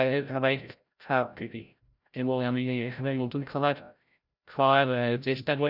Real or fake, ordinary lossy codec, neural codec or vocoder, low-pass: fake; none; codec, 16 kHz, 0.5 kbps, FreqCodec, larger model; 5.4 kHz